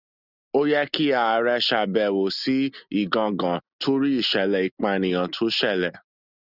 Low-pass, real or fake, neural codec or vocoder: 5.4 kHz; real; none